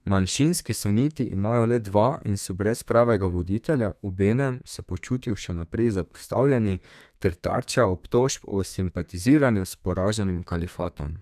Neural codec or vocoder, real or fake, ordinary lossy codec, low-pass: codec, 32 kHz, 1.9 kbps, SNAC; fake; none; 14.4 kHz